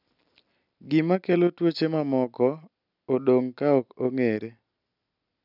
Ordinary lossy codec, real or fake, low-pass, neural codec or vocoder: none; real; 5.4 kHz; none